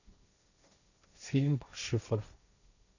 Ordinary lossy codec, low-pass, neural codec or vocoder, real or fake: none; 7.2 kHz; codec, 16 kHz, 1.1 kbps, Voila-Tokenizer; fake